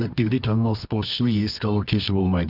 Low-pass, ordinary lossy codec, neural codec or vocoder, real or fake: 5.4 kHz; none; codec, 24 kHz, 0.9 kbps, WavTokenizer, medium music audio release; fake